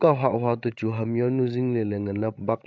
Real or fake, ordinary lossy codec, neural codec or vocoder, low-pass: fake; none; codec, 16 kHz, 16 kbps, FreqCodec, larger model; none